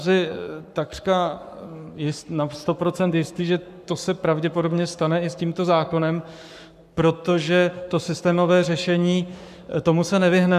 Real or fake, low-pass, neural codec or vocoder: fake; 14.4 kHz; codec, 44.1 kHz, 7.8 kbps, Pupu-Codec